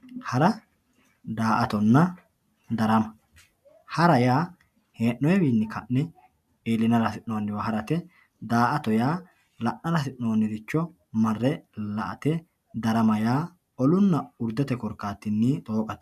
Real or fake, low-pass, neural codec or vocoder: real; 14.4 kHz; none